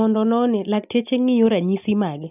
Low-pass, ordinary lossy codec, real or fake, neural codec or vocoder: 3.6 kHz; none; real; none